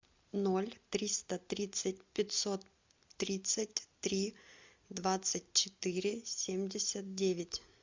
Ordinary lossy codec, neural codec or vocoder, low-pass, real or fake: MP3, 64 kbps; none; 7.2 kHz; real